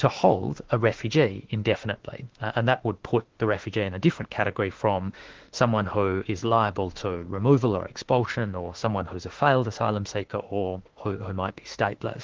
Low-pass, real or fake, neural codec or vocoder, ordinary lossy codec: 7.2 kHz; fake; autoencoder, 48 kHz, 32 numbers a frame, DAC-VAE, trained on Japanese speech; Opus, 16 kbps